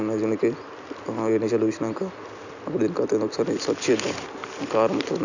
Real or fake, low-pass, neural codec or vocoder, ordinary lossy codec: real; 7.2 kHz; none; none